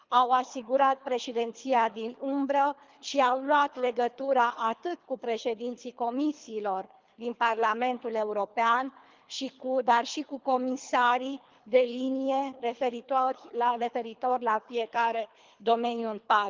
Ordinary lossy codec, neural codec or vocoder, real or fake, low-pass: Opus, 24 kbps; codec, 24 kHz, 3 kbps, HILCodec; fake; 7.2 kHz